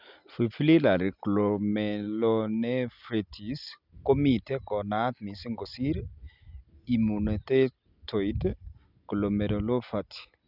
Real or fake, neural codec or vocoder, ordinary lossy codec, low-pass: real; none; none; 5.4 kHz